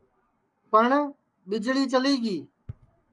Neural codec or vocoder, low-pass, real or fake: codec, 44.1 kHz, 7.8 kbps, Pupu-Codec; 10.8 kHz; fake